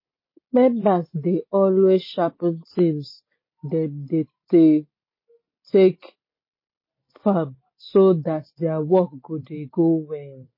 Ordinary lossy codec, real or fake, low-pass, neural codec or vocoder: MP3, 24 kbps; real; 5.4 kHz; none